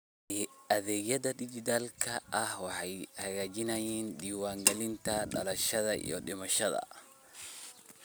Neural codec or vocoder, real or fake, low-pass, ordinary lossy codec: none; real; none; none